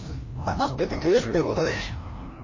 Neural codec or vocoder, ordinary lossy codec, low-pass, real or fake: codec, 16 kHz, 1 kbps, FreqCodec, larger model; MP3, 32 kbps; 7.2 kHz; fake